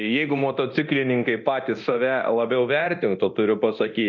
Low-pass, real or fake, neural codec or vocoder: 7.2 kHz; fake; codec, 24 kHz, 0.9 kbps, DualCodec